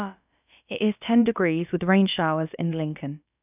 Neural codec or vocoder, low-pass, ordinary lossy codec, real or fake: codec, 16 kHz, about 1 kbps, DyCAST, with the encoder's durations; 3.6 kHz; none; fake